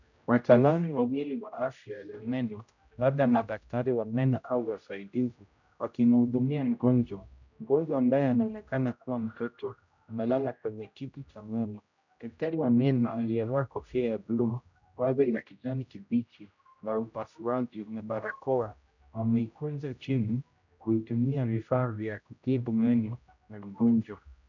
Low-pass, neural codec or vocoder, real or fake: 7.2 kHz; codec, 16 kHz, 0.5 kbps, X-Codec, HuBERT features, trained on general audio; fake